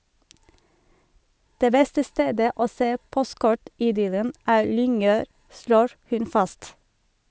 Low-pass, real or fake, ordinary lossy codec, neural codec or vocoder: none; real; none; none